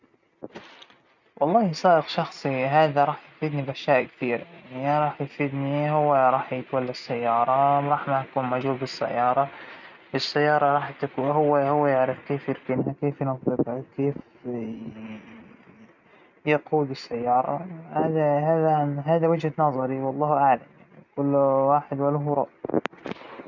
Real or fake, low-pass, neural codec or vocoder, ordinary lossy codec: real; 7.2 kHz; none; none